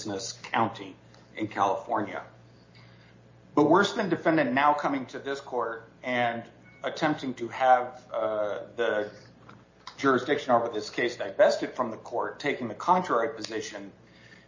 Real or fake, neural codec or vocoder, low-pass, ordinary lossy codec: real; none; 7.2 kHz; MP3, 32 kbps